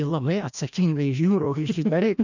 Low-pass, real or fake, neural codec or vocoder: 7.2 kHz; fake; codec, 16 kHz, 1 kbps, FreqCodec, larger model